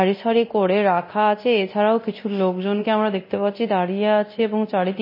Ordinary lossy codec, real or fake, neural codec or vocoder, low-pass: MP3, 24 kbps; fake; codec, 24 kHz, 0.9 kbps, DualCodec; 5.4 kHz